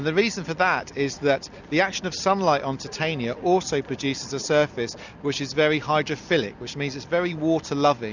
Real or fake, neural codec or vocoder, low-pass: real; none; 7.2 kHz